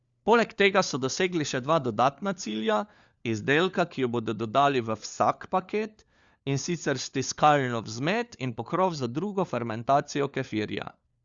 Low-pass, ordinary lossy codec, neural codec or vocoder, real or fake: 7.2 kHz; Opus, 64 kbps; codec, 16 kHz, 4 kbps, FunCodec, trained on LibriTTS, 50 frames a second; fake